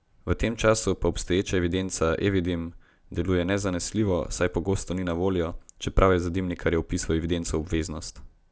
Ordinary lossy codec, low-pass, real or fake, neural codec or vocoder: none; none; real; none